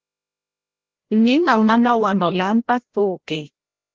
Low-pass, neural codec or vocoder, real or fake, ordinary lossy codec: 7.2 kHz; codec, 16 kHz, 0.5 kbps, FreqCodec, larger model; fake; Opus, 16 kbps